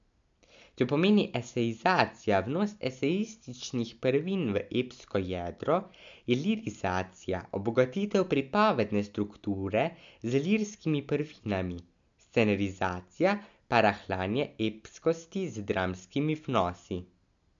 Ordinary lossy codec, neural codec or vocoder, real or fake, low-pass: MP3, 64 kbps; none; real; 7.2 kHz